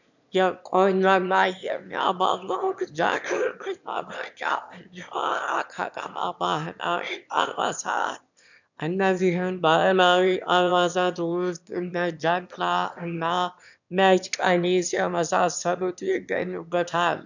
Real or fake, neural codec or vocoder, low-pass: fake; autoencoder, 22.05 kHz, a latent of 192 numbers a frame, VITS, trained on one speaker; 7.2 kHz